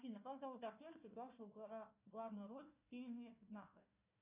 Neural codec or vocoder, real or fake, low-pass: codec, 16 kHz, 1 kbps, FunCodec, trained on Chinese and English, 50 frames a second; fake; 3.6 kHz